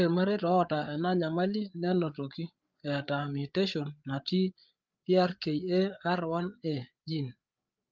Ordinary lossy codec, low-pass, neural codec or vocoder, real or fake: Opus, 24 kbps; 7.2 kHz; codec, 16 kHz, 8 kbps, FreqCodec, larger model; fake